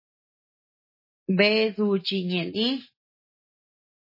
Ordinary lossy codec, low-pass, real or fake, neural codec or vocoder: MP3, 24 kbps; 5.4 kHz; real; none